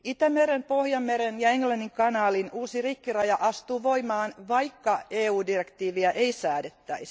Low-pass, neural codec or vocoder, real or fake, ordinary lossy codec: none; none; real; none